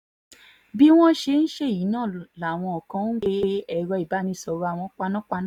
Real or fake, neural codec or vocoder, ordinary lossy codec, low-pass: real; none; none; 19.8 kHz